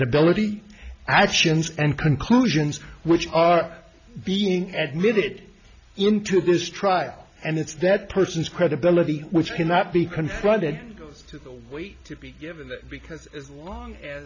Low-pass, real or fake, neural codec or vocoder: 7.2 kHz; real; none